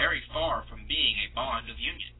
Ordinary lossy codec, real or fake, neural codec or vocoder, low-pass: AAC, 16 kbps; real; none; 7.2 kHz